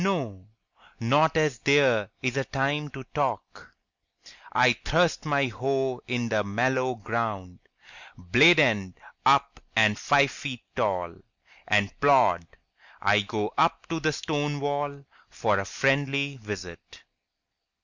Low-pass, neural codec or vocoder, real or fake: 7.2 kHz; none; real